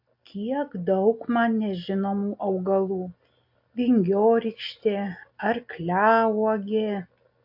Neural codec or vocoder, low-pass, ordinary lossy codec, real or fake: none; 5.4 kHz; AAC, 48 kbps; real